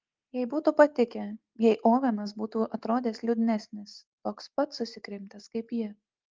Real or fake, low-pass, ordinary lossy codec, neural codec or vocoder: real; 7.2 kHz; Opus, 32 kbps; none